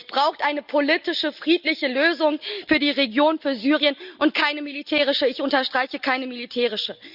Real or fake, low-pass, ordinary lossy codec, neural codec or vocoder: real; 5.4 kHz; Opus, 64 kbps; none